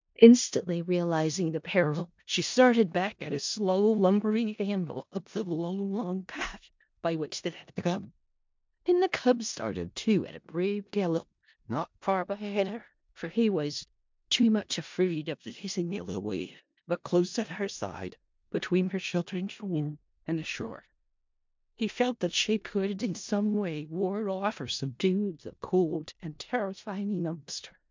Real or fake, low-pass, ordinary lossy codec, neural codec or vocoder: fake; 7.2 kHz; MP3, 64 kbps; codec, 16 kHz in and 24 kHz out, 0.4 kbps, LongCat-Audio-Codec, four codebook decoder